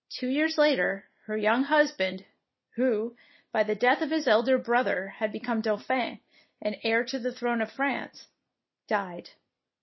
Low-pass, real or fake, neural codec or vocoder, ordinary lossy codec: 7.2 kHz; real; none; MP3, 24 kbps